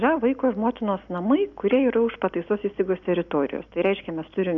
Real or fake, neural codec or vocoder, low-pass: real; none; 7.2 kHz